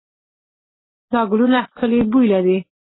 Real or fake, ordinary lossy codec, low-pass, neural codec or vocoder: real; AAC, 16 kbps; 7.2 kHz; none